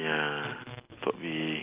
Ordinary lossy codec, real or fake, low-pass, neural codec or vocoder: Opus, 24 kbps; real; 3.6 kHz; none